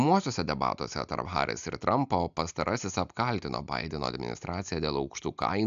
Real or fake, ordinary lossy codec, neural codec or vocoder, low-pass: real; AAC, 96 kbps; none; 7.2 kHz